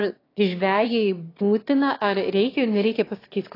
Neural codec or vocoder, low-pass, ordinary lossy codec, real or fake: autoencoder, 22.05 kHz, a latent of 192 numbers a frame, VITS, trained on one speaker; 5.4 kHz; AAC, 24 kbps; fake